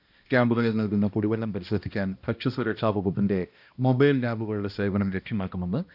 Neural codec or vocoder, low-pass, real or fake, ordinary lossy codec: codec, 16 kHz, 1 kbps, X-Codec, HuBERT features, trained on balanced general audio; 5.4 kHz; fake; none